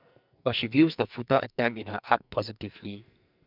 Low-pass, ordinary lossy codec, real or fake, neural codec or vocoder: 5.4 kHz; none; fake; codec, 44.1 kHz, 2.6 kbps, SNAC